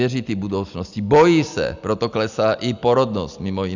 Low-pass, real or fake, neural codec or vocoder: 7.2 kHz; real; none